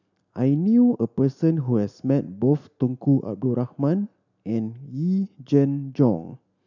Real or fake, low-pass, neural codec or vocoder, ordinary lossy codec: real; 7.2 kHz; none; none